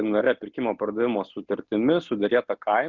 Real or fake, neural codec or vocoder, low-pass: real; none; 7.2 kHz